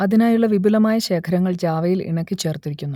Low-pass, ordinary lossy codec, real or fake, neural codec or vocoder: 19.8 kHz; none; real; none